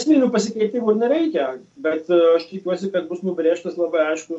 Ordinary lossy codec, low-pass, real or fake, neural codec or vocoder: MP3, 64 kbps; 10.8 kHz; real; none